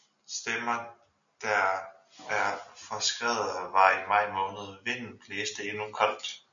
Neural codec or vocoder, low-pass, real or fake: none; 7.2 kHz; real